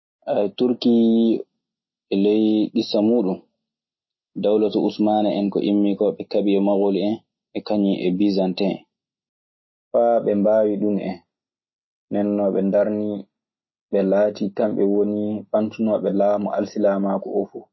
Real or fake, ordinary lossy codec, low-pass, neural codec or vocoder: real; MP3, 24 kbps; 7.2 kHz; none